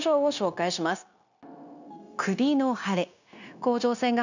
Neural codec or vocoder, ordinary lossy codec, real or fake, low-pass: codec, 16 kHz, 0.9 kbps, LongCat-Audio-Codec; none; fake; 7.2 kHz